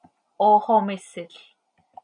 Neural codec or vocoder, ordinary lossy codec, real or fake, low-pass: none; MP3, 96 kbps; real; 9.9 kHz